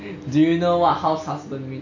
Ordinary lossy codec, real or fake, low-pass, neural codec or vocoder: none; real; 7.2 kHz; none